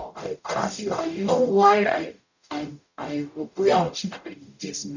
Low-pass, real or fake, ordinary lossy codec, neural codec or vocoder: 7.2 kHz; fake; none; codec, 44.1 kHz, 0.9 kbps, DAC